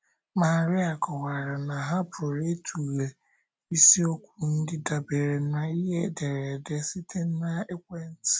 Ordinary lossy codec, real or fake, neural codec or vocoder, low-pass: none; real; none; none